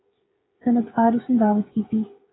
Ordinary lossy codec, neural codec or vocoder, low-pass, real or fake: AAC, 16 kbps; codec, 16 kHz, 16 kbps, FreqCodec, smaller model; 7.2 kHz; fake